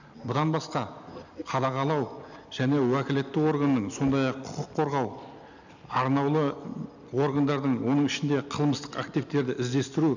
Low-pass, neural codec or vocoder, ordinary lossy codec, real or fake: 7.2 kHz; none; none; real